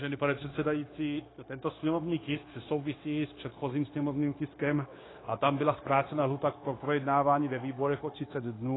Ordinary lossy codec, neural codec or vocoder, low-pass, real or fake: AAC, 16 kbps; codec, 16 kHz, 0.9 kbps, LongCat-Audio-Codec; 7.2 kHz; fake